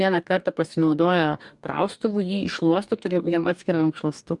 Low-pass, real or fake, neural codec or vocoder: 10.8 kHz; fake; codec, 44.1 kHz, 2.6 kbps, DAC